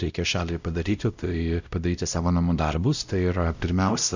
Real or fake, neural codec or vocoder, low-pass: fake; codec, 16 kHz, 0.5 kbps, X-Codec, WavLM features, trained on Multilingual LibriSpeech; 7.2 kHz